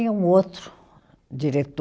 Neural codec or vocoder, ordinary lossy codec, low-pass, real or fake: none; none; none; real